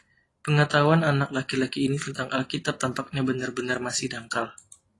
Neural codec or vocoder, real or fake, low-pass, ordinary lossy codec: none; real; 10.8 kHz; AAC, 32 kbps